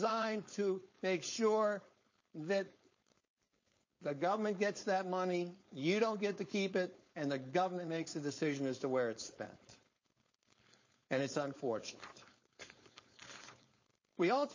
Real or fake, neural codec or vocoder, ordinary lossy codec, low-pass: fake; codec, 16 kHz, 4.8 kbps, FACodec; MP3, 32 kbps; 7.2 kHz